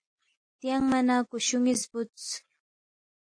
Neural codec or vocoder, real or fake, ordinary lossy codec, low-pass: none; real; AAC, 48 kbps; 9.9 kHz